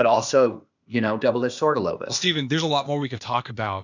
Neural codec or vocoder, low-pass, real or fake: codec, 16 kHz, 0.8 kbps, ZipCodec; 7.2 kHz; fake